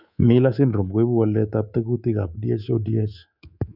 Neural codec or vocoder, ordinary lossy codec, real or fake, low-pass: codec, 24 kHz, 3.1 kbps, DualCodec; none; fake; 5.4 kHz